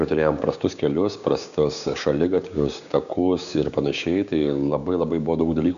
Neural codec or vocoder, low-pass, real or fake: codec, 16 kHz, 6 kbps, DAC; 7.2 kHz; fake